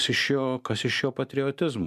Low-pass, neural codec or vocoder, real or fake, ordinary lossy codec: 14.4 kHz; none; real; MP3, 96 kbps